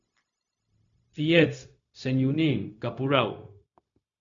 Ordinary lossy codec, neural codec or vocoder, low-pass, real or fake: MP3, 48 kbps; codec, 16 kHz, 0.4 kbps, LongCat-Audio-Codec; 7.2 kHz; fake